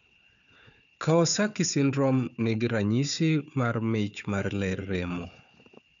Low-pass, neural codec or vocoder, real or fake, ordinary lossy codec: 7.2 kHz; codec, 16 kHz, 4 kbps, FunCodec, trained on Chinese and English, 50 frames a second; fake; none